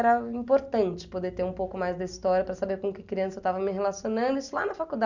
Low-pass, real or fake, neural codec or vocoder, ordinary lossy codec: 7.2 kHz; real; none; none